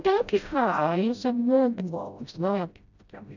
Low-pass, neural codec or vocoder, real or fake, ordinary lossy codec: 7.2 kHz; codec, 16 kHz, 0.5 kbps, FreqCodec, smaller model; fake; none